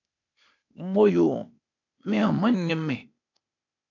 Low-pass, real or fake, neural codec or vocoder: 7.2 kHz; fake; codec, 16 kHz, 0.8 kbps, ZipCodec